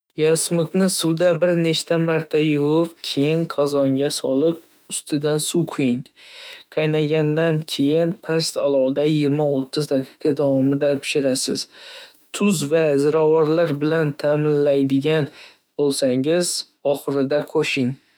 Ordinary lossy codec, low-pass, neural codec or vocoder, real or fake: none; none; autoencoder, 48 kHz, 32 numbers a frame, DAC-VAE, trained on Japanese speech; fake